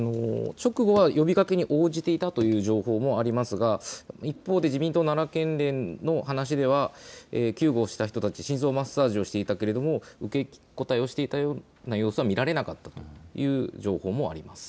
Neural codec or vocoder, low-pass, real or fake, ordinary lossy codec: none; none; real; none